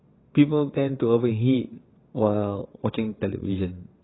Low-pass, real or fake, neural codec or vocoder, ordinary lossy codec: 7.2 kHz; fake; vocoder, 22.05 kHz, 80 mel bands, WaveNeXt; AAC, 16 kbps